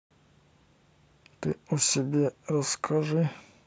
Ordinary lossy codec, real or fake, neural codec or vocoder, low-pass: none; real; none; none